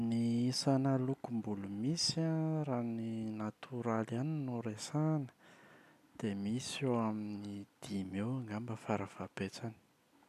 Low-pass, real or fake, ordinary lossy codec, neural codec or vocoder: 14.4 kHz; real; none; none